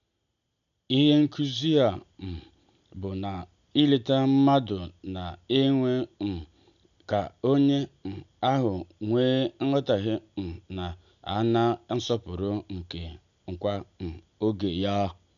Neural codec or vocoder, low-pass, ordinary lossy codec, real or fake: none; 7.2 kHz; none; real